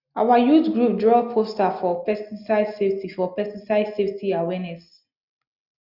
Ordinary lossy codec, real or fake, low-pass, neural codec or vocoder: none; real; 5.4 kHz; none